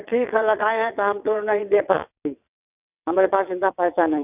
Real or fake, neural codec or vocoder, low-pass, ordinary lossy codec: fake; vocoder, 22.05 kHz, 80 mel bands, WaveNeXt; 3.6 kHz; none